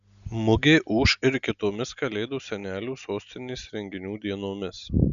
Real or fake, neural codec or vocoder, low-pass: real; none; 7.2 kHz